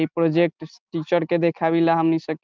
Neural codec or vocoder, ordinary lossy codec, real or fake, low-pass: none; Opus, 24 kbps; real; 7.2 kHz